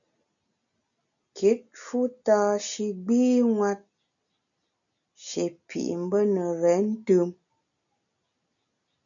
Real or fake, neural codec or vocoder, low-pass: real; none; 7.2 kHz